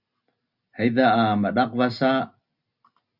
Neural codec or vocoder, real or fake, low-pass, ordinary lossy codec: none; real; 5.4 kHz; Opus, 64 kbps